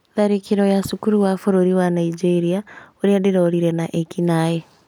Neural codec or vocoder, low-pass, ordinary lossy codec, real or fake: none; 19.8 kHz; none; real